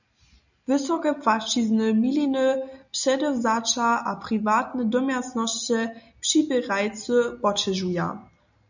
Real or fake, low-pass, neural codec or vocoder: real; 7.2 kHz; none